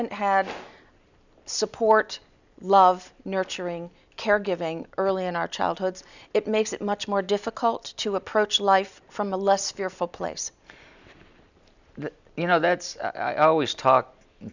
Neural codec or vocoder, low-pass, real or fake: none; 7.2 kHz; real